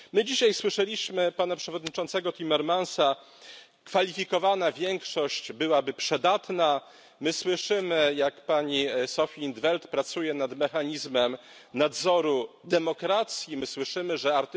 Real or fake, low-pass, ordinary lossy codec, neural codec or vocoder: real; none; none; none